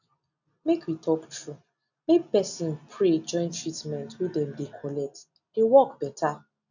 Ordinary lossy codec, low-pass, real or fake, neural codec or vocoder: none; 7.2 kHz; real; none